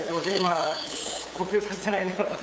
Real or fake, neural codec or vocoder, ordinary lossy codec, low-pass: fake; codec, 16 kHz, 8 kbps, FunCodec, trained on LibriTTS, 25 frames a second; none; none